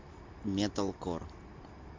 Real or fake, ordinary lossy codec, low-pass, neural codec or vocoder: real; MP3, 48 kbps; 7.2 kHz; none